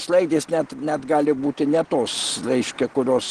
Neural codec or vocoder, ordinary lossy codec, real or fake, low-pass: none; Opus, 16 kbps; real; 9.9 kHz